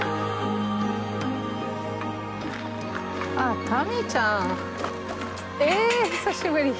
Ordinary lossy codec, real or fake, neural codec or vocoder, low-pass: none; real; none; none